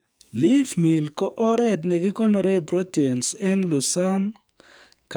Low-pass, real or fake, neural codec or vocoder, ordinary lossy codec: none; fake; codec, 44.1 kHz, 2.6 kbps, SNAC; none